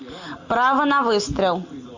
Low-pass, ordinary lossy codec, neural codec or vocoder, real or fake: 7.2 kHz; AAC, 48 kbps; none; real